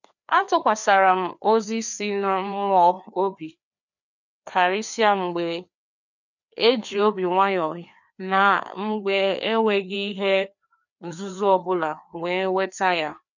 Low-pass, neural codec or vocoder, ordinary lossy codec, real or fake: 7.2 kHz; codec, 16 kHz, 2 kbps, FreqCodec, larger model; none; fake